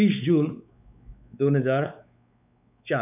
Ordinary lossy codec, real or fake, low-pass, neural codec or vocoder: none; fake; 3.6 kHz; codec, 16 kHz, 4 kbps, X-Codec, WavLM features, trained on Multilingual LibriSpeech